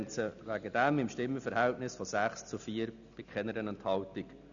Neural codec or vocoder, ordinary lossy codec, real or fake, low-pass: none; none; real; 7.2 kHz